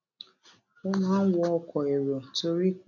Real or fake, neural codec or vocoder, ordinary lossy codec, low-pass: real; none; none; 7.2 kHz